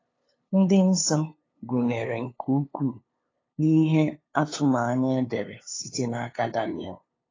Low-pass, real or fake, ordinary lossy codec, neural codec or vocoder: 7.2 kHz; fake; AAC, 32 kbps; codec, 16 kHz, 2 kbps, FunCodec, trained on LibriTTS, 25 frames a second